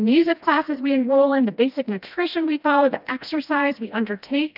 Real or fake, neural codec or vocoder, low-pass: fake; codec, 16 kHz, 1 kbps, FreqCodec, smaller model; 5.4 kHz